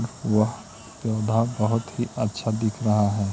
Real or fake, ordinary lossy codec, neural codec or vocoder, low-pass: real; none; none; none